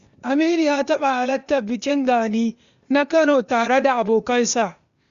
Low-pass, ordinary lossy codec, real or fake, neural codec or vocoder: 7.2 kHz; Opus, 64 kbps; fake; codec, 16 kHz, 0.8 kbps, ZipCodec